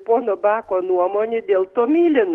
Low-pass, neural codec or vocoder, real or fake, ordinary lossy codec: 19.8 kHz; codec, 44.1 kHz, 7.8 kbps, DAC; fake; Opus, 16 kbps